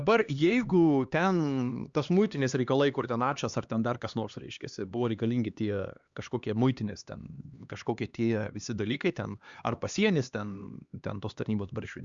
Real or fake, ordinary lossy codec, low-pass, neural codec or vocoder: fake; Opus, 64 kbps; 7.2 kHz; codec, 16 kHz, 2 kbps, X-Codec, HuBERT features, trained on LibriSpeech